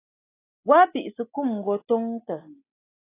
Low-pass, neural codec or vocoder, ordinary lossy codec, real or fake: 3.6 kHz; none; AAC, 16 kbps; real